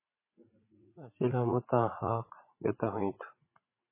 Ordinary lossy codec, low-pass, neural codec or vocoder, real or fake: MP3, 16 kbps; 3.6 kHz; vocoder, 44.1 kHz, 80 mel bands, Vocos; fake